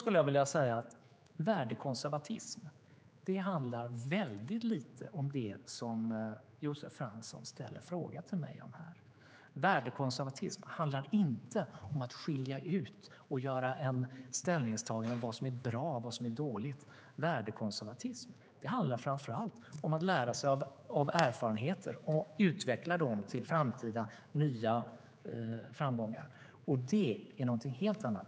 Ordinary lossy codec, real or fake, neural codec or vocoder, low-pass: none; fake; codec, 16 kHz, 4 kbps, X-Codec, HuBERT features, trained on general audio; none